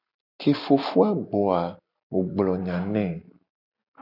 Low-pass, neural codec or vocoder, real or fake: 5.4 kHz; none; real